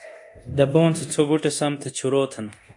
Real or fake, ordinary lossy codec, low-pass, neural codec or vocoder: fake; AAC, 64 kbps; 10.8 kHz; codec, 24 kHz, 0.9 kbps, DualCodec